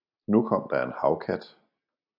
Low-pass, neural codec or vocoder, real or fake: 5.4 kHz; none; real